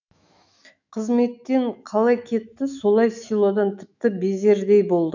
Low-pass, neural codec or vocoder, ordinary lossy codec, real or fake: 7.2 kHz; autoencoder, 48 kHz, 128 numbers a frame, DAC-VAE, trained on Japanese speech; none; fake